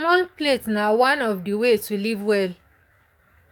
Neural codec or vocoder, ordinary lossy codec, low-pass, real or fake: codec, 44.1 kHz, 7.8 kbps, DAC; none; 19.8 kHz; fake